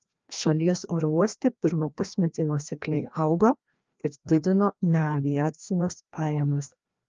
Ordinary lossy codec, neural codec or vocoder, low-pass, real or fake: Opus, 24 kbps; codec, 16 kHz, 1 kbps, FreqCodec, larger model; 7.2 kHz; fake